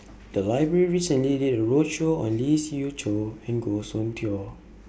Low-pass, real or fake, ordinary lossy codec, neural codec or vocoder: none; real; none; none